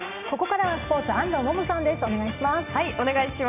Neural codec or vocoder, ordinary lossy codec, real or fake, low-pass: none; none; real; 3.6 kHz